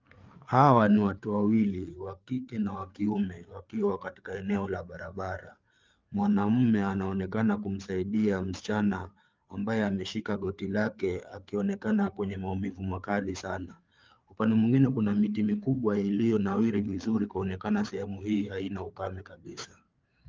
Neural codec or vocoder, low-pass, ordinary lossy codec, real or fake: codec, 16 kHz, 4 kbps, FreqCodec, larger model; 7.2 kHz; Opus, 24 kbps; fake